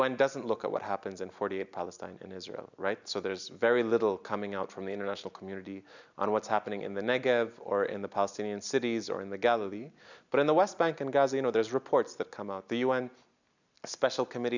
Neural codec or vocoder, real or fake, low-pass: none; real; 7.2 kHz